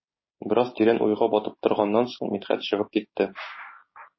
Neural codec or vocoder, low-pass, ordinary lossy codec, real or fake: codec, 16 kHz, 6 kbps, DAC; 7.2 kHz; MP3, 24 kbps; fake